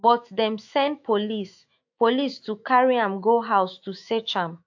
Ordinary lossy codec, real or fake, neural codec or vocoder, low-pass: AAC, 48 kbps; fake; autoencoder, 48 kHz, 128 numbers a frame, DAC-VAE, trained on Japanese speech; 7.2 kHz